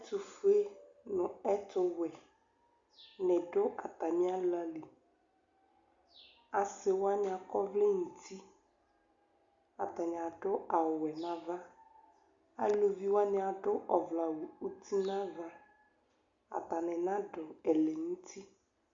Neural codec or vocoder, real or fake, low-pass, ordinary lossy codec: none; real; 7.2 kHz; Opus, 64 kbps